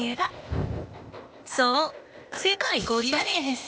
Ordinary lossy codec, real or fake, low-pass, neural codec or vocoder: none; fake; none; codec, 16 kHz, 0.8 kbps, ZipCodec